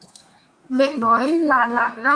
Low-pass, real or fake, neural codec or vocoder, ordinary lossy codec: 9.9 kHz; fake; codec, 24 kHz, 1 kbps, SNAC; Opus, 64 kbps